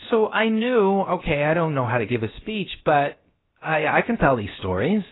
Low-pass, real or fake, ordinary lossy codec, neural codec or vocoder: 7.2 kHz; fake; AAC, 16 kbps; codec, 16 kHz, about 1 kbps, DyCAST, with the encoder's durations